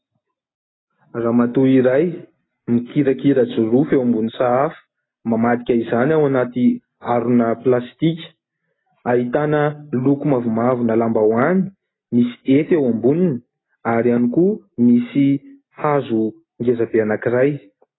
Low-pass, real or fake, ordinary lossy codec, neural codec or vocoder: 7.2 kHz; real; AAC, 16 kbps; none